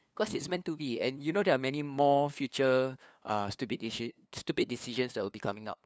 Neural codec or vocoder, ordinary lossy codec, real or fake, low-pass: codec, 16 kHz, 2 kbps, FunCodec, trained on LibriTTS, 25 frames a second; none; fake; none